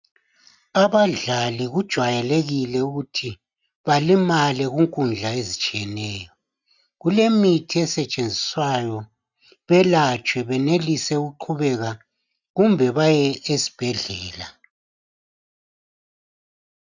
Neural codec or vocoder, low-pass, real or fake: none; 7.2 kHz; real